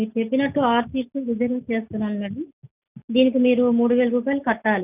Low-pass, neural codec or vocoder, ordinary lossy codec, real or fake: 3.6 kHz; none; none; real